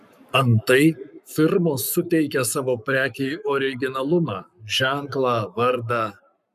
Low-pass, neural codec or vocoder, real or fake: 14.4 kHz; codec, 44.1 kHz, 7.8 kbps, Pupu-Codec; fake